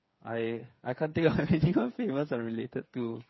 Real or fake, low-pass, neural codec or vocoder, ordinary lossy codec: fake; 7.2 kHz; codec, 16 kHz, 8 kbps, FreqCodec, smaller model; MP3, 24 kbps